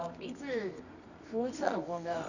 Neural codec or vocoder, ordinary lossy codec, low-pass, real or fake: codec, 24 kHz, 0.9 kbps, WavTokenizer, medium music audio release; none; 7.2 kHz; fake